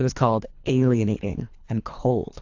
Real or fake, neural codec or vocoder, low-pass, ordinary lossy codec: fake; codec, 24 kHz, 3 kbps, HILCodec; 7.2 kHz; AAC, 48 kbps